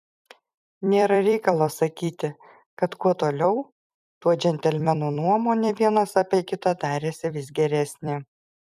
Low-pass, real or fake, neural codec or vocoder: 14.4 kHz; fake; vocoder, 44.1 kHz, 128 mel bands every 256 samples, BigVGAN v2